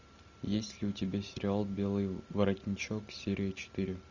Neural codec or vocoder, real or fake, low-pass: none; real; 7.2 kHz